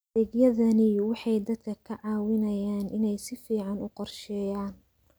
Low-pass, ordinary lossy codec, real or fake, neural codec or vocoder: none; none; real; none